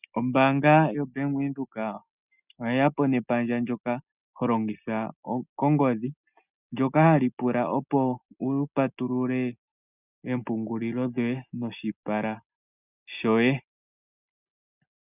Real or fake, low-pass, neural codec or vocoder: real; 3.6 kHz; none